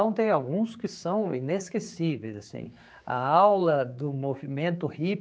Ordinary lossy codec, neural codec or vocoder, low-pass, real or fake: none; codec, 16 kHz, 4 kbps, X-Codec, HuBERT features, trained on general audio; none; fake